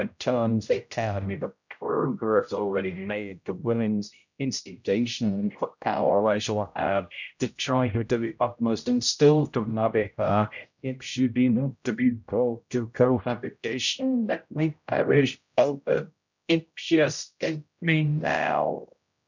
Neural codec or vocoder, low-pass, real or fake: codec, 16 kHz, 0.5 kbps, X-Codec, HuBERT features, trained on general audio; 7.2 kHz; fake